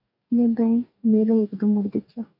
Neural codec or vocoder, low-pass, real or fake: codec, 44.1 kHz, 2.6 kbps, DAC; 5.4 kHz; fake